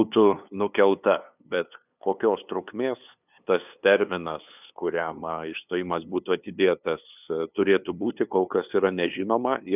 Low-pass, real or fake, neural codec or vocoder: 3.6 kHz; fake; codec, 16 kHz, 2 kbps, FunCodec, trained on LibriTTS, 25 frames a second